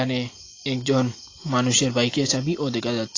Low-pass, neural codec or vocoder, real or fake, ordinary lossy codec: 7.2 kHz; none; real; AAC, 32 kbps